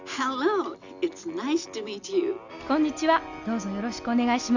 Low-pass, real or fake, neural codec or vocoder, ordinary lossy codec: 7.2 kHz; real; none; none